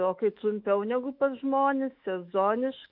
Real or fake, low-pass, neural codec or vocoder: fake; 5.4 kHz; autoencoder, 48 kHz, 128 numbers a frame, DAC-VAE, trained on Japanese speech